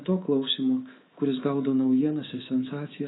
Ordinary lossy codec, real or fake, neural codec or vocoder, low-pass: AAC, 16 kbps; fake; codec, 24 kHz, 3.1 kbps, DualCodec; 7.2 kHz